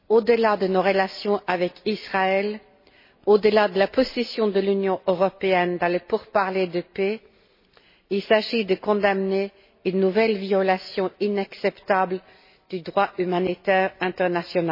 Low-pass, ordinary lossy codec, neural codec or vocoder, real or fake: 5.4 kHz; MP3, 24 kbps; none; real